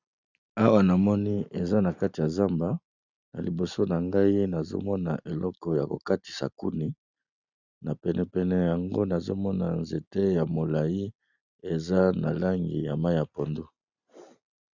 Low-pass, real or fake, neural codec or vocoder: 7.2 kHz; real; none